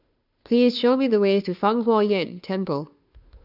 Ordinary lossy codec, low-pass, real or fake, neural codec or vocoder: none; 5.4 kHz; fake; codec, 16 kHz, 2 kbps, FunCodec, trained on Chinese and English, 25 frames a second